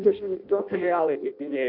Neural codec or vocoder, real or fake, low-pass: codec, 16 kHz in and 24 kHz out, 0.6 kbps, FireRedTTS-2 codec; fake; 5.4 kHz